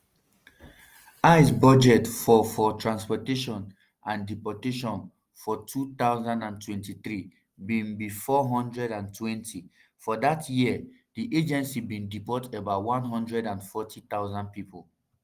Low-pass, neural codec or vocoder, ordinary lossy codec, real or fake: 14.4 kHz; none; Opus, 24 kbps; real